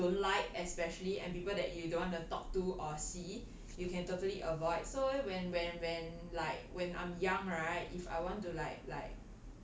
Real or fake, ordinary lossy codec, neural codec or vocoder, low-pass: real; none; none; none